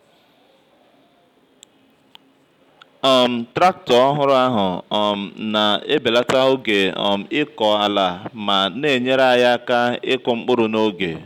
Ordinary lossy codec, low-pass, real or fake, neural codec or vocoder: none; 19.8 kHz; real; none